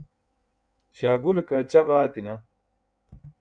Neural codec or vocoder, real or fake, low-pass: codec, 16 kHz in and 24 kHz out, 1.1 kbps, FireRedTTS-2 codec; fake; 9.9 kHz